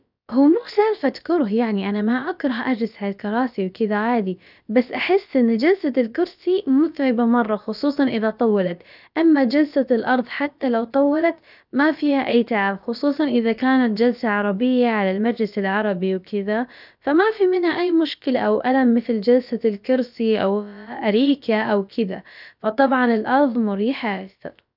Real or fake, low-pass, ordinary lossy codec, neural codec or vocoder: fake; 5.4 kHz; none; codec, 16 kHz, about 1 kbps, DyCAST, with the encoder's durations